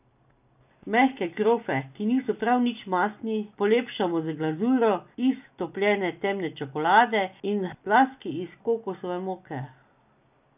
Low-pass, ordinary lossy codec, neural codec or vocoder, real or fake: 3.6 kHz; none; none; real